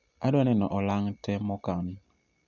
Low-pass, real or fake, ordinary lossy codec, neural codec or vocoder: 7.2 kHz; real; none; none